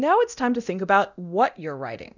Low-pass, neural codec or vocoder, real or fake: 7.2 kHz; codec, 24 kHz, 0.9 kbps, DualCodec; fake